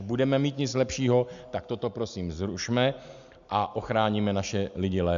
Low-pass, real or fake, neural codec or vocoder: 7.2 kHz; real; none